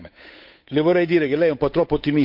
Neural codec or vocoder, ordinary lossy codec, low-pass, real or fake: codec, 16 kHz, 8 kbps, FunCodec, trained on Chinese and English, 25 frames a second; MP3, 48 kbps; 5.4 kHz; fake